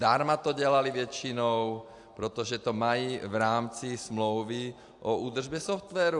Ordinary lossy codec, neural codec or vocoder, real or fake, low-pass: AAC, 64 kbps; none; real; 10.8 kHz